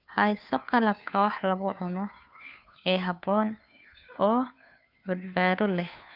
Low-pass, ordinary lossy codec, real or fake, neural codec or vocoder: 5.4 kHz; none; fake; codec, 16 kHz, 2 kbps, FunCodec, trained on Chinese and English, 25 frames a second